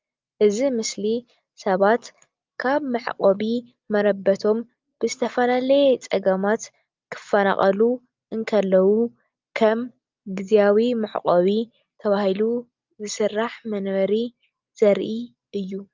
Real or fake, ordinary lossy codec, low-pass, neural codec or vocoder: real; Opus, 24 kbps; 7.2 kHz; none